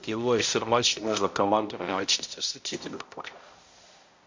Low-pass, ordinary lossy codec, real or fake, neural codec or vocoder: 7.2 kHz; MP3, 48 kbps; fake; codec, 16 kHz, 0.5 kbps, X-Codec, HuBERT features, trained on balanced general audio